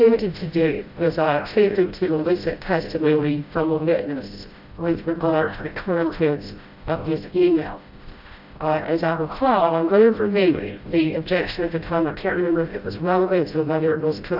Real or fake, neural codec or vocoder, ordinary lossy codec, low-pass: fake; codec, 16 kHz, 0.5 kbps, FreqCodec, smaller model; AAC, 48 kbps; 5.4 kHz